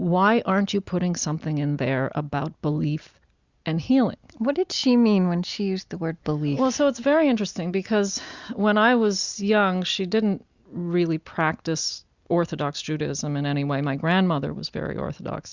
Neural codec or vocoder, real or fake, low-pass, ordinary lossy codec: none; real; 7.2 kHz; Opus, 64 kbps